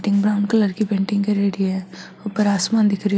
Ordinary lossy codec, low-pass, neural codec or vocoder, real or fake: none; none; none; real